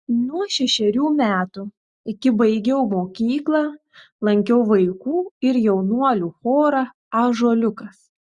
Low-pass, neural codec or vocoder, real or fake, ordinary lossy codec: 10.8 kHz; none; real; Opus, 64 kbps